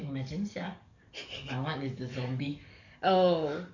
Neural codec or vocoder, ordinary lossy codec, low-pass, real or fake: codec, 44.1 kHz, 7.8 kbps, DAC; none; 7.2 kHz; fake